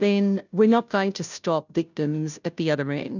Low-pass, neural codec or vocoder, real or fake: 7.2 kHz; codec, 16 kHz, 0.5 kbps, FunCodec, trained on Chinese and English, 25 frames a second; fake